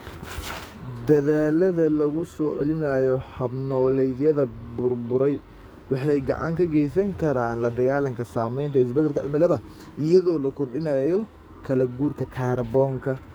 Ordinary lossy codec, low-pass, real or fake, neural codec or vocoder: none; none; fake; codec, 44.1 kHz, 2.6 kbps, SNAC